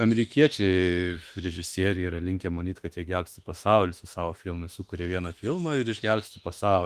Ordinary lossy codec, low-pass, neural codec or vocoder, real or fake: Opus, 32 kbps; 14.4 kHz; autoencoder, 48 kHz, 32 numbers a frame, DAC-VAE, trained on Japanese speech; fake